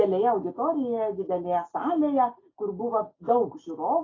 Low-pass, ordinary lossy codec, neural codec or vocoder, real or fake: 7.2 kHz; AAC, 32 kbps; none; real